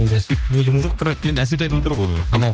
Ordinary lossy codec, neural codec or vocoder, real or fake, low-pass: none; codec, 16 kHz, 1 kbps, X-Codec, HuBERT features, trained on general audio; fake; none